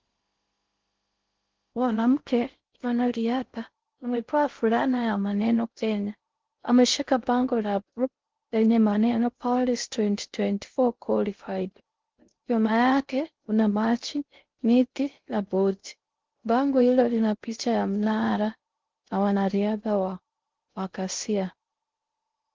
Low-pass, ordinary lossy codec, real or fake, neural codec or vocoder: 7.2 kHz; Opus, 16 kbps; fake; codec, 16 kHz in and 24 kHz out, 0.6 kbps, FocalCodec, streaming, 4096 codes